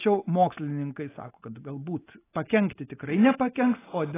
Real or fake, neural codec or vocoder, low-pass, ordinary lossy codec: real; none; 3.6 kHz; AAC, 16 kbps